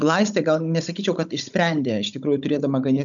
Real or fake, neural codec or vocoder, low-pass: fake; codec, 16 kHz, 16 kbps, FunCodec, trained on Chinese and English, 50 frames a second; 7.2 kHz